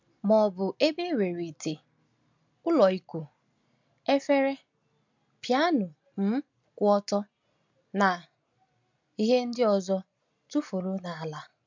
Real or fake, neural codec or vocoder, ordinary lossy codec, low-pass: real; none; MP3, 64 kbps; 7.2 kHz